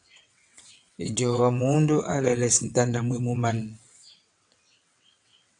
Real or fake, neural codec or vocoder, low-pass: fake; vocoder, 22.05 kHz, 80 mel bands, WaveNeXt; 9.9 kHz